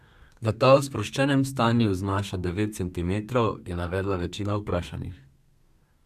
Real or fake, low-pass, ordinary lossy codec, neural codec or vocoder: fake; 14.4 kHz; none; codec, 32 kHz, 1.9 kbps, SNAC